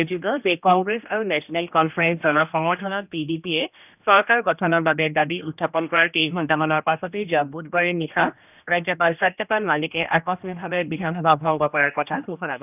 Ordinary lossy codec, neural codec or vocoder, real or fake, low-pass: none; codec, 16 kHz, 1 kbps, X-Codec, HuBERT features, trained on general audio; fake; 3.6 kHz